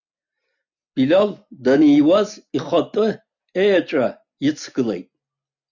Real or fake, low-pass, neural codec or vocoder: real; 7.2 kHz; none